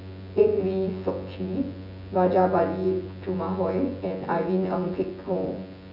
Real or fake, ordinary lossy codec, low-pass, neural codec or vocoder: fake; none; 5.4 kHz; vocoder, 24 kHz, 100 mel bands, Vocos